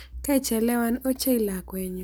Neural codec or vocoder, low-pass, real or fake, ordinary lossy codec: none; none; real; none